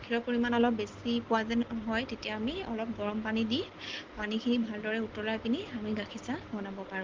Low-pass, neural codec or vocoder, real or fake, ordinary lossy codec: 7.2 kHz; none; real; Opus, 16 kbps